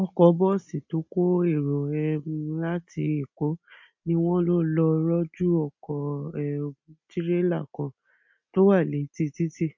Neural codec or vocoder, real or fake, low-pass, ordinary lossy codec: none; real; 7.2 kHz; MP3, 48 kbps